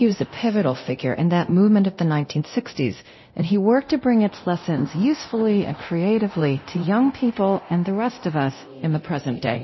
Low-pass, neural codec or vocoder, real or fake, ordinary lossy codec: 7.2 kHz; codec, 24 kHz, 0.9 kbps, DualCodec; fake; MP3, 24 kbps